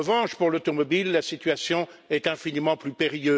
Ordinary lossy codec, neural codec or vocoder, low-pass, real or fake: none; none; none; real